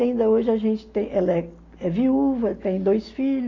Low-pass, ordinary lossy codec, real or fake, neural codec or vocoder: 7.2 kHz; AAC, 32 kbps; real; none